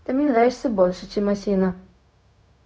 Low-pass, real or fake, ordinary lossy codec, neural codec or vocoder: none; fake; none; codec, 16 kHz, 0.4 kbps, LongCat-Audio-Codec